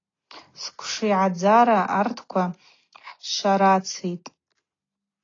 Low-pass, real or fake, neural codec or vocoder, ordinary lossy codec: 7.2 kHz; real; none; AAC, 32 kbps